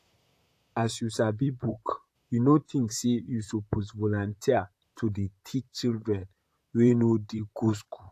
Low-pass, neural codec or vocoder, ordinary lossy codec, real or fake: 14.4 kHz; vocoder, 44.1 kHz, 128 mel bands, Pupu-Vocoder; AAC, 64 kbps; fake